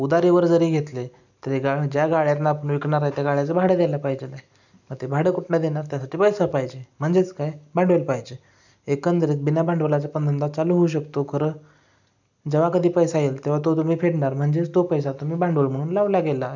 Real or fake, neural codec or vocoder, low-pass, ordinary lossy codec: real; none; 7.2 kHz; none